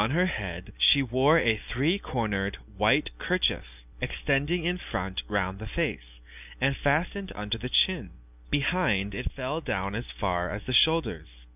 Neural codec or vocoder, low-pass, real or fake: none; 3.6 kHz; real